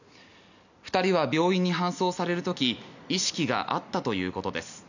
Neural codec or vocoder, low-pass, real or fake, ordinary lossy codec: none; 7.2 kHz; real; none